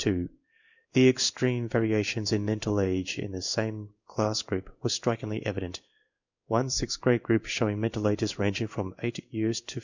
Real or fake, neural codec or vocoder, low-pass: fake; codec, 16 kHz in and 24 kHz out, 1 kbps, XY-Tokenizer; 7.2 kHz